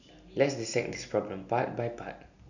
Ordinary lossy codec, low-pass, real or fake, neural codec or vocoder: none; 7.2 kHz; real; none